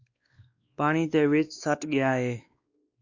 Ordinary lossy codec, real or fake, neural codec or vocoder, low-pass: Opus, 64 kbps; fake; codec, 16 kHz, 2 kbps, X-Codec, WavLM features, trained on Multilingual LibriSpeech; 7.2 kHz